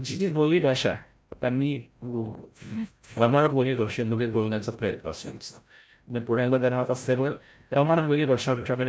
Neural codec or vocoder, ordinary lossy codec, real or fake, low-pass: codec, 16 kHz, 0.5 kbps, FreqCodec, larger model; none; fake; none